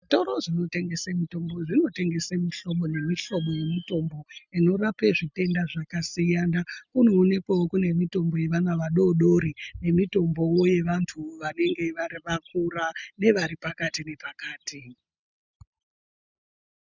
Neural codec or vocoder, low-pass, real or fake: none; 7.2 kHz; real